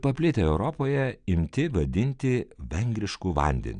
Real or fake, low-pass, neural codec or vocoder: real; 9.9 kHz; none